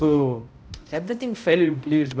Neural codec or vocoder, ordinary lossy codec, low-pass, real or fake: codec, 16 kHz, 0.5 kbps, X-Codec, HuBERT features, trained on balanced general audio; none; none; fake